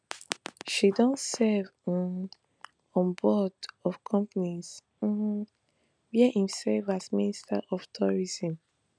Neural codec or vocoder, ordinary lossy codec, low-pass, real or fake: none; none; 9.9 kHz; real